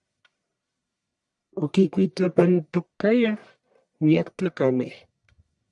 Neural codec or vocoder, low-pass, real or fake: codec, 44.1 kHz, 1.7 kbps, Pupu-Codec; 10.8 kHz; fake